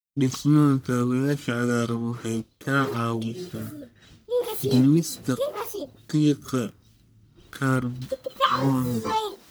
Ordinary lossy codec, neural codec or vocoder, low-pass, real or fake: none; codec, 44.1 kHz, 1.7 kbps, Pupu-Codec; none; fake